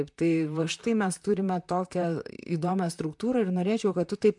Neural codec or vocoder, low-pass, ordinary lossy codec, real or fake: vocoder, 44.1 kHz, 128 mel bands, Pupu-Vocoder; 10.8 kHz; MP3, 64 kbps; fake